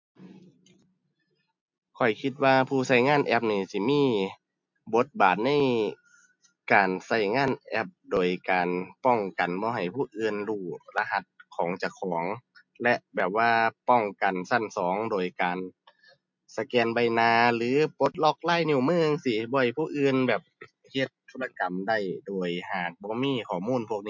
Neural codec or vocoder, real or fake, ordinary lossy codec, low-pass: none; real; MP3, 64 kbps; 7.2 kHz